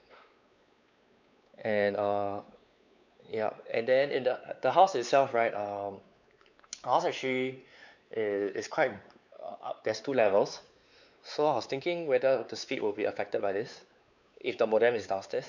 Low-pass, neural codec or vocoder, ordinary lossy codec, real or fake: 7.2 kHz; codec, 16 kHz, 4 kbps, X-Codec, WavLM features, trained on Multilingual LibriSpeech; none; fake